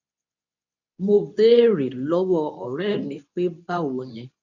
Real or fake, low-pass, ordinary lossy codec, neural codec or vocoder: fake; 7.2 kHz; none; codec, 24 kHz, 0.9 kbps, WavTokenizer, medium speech release version 2